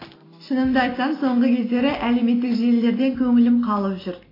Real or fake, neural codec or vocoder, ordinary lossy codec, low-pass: real; none; AAC, 24 kbps; 5.4 kHz